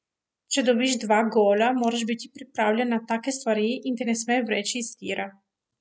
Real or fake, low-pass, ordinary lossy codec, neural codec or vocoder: real; none; none; none